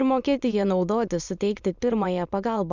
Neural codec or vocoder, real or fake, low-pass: autoencoder, 22.05 kHz, a latent of 192 numbers a frame, VITS, trained on many speakers; fake; 7.2 kHz